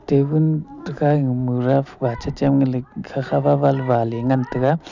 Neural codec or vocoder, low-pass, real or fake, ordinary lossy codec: none; 7.2 kHz; real; none